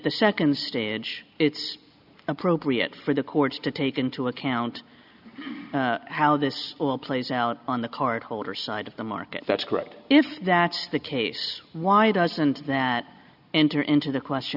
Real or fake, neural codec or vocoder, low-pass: real; none; 5.4 kHz